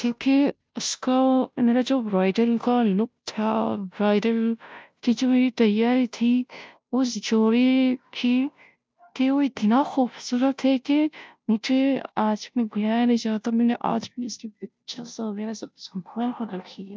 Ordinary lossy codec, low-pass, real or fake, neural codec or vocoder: none; none; fake; codec, 16 kHz, 0.5 kbps, FunCodec, trained on Chinese and English, 25 frames a second